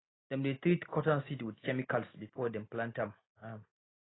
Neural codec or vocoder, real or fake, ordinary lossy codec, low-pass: none; real; AAC, 16 kbps; 7.2 kHz